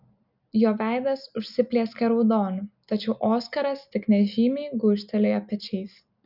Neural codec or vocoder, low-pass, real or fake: none; 5.4 kHz; real